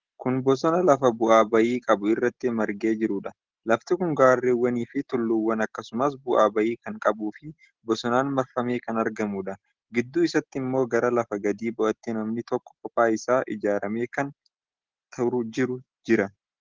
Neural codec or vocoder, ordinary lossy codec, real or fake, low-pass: none; Opus, 16 kbps; real; 7.2 kHz